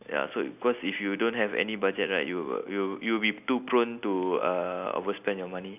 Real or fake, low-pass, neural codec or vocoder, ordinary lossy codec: real; 3.6 kHz; none; none